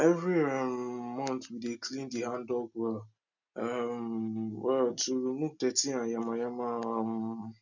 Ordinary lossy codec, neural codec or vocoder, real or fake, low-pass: none; none; real; 7.2 kHz